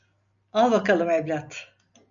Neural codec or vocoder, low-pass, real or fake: none; 7.2 kHz; real